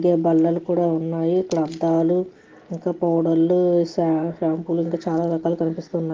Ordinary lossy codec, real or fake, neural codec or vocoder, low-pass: Opus, 16 kbps; real; none; 7.2 kHz